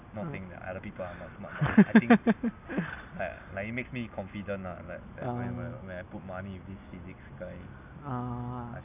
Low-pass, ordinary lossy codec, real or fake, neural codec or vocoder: 3.6 kHz; none; real; none